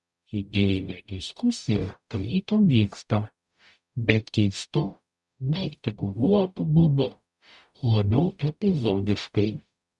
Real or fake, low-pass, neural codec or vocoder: fake; 10.8 kHz; codec, 44.1 kHz, 0.9 kbps, DAC